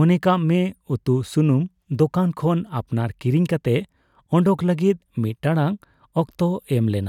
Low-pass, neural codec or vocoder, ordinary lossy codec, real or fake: 19.8 kHz; none; none; real